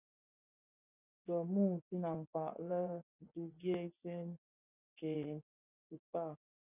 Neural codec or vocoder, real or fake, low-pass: vocoder, 24 kHz, 100 mel bands, Vocos; fake; 3.6 kHz